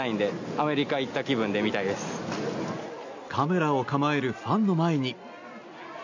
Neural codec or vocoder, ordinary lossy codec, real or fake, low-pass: none; AAC, 48 kbps; real; 7.2 kHz